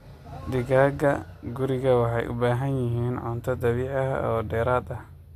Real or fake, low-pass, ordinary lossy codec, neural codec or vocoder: real; 14.4 kHz; AAC, 64 kbps; none